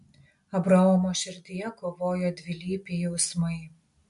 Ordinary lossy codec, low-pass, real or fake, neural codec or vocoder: MP3, 64 kbps; 10.8 kHz; real; none